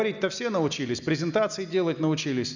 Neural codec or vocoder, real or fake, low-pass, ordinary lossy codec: none; real; 7.2 kHz; none